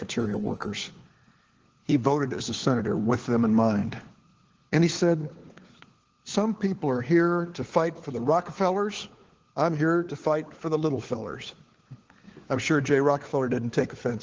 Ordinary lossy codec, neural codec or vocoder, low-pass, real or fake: Opus, 16 kbps; codec, 16 kHz, 4 kbps, FunCodec, trained on LibriTTS, 50 frames a second; 7.2 kHz; fake